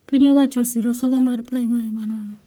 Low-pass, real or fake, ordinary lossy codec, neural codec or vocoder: none; fake; none; codec, 44.1 kHz, 1.7 kbps, Pupu-Codec